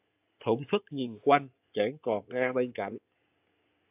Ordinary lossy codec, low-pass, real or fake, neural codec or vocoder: AAC, 32 kbps; 3.6 kHz; fake; codec, 16 kHz in and 24 kHz out, 2.2 kbps, FireRedTTS-2 codec